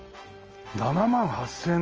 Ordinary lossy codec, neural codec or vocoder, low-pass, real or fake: Opus, 24 kbps; none; 7.2 kHz; real